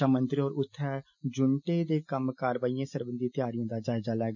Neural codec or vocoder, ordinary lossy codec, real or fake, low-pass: none; none; real; 7.2 kHz